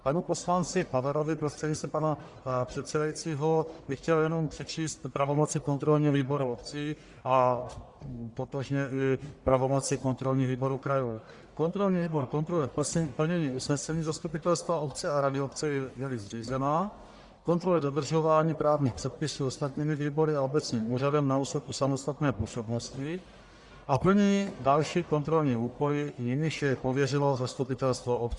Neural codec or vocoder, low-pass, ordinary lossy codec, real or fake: codec, 44.1 kHz, 1.7 kbps, Pupu-Codec; 10.8 kHz; Opus, 64 kbps; fake